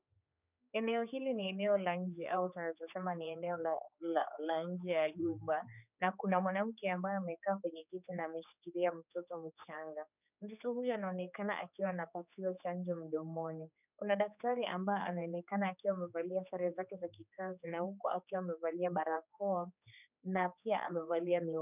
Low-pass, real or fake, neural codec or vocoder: 3.6 kHz; fake; codec, 16 kHz, 4 kbps, X-Codec, HuBERT features, trained on general audio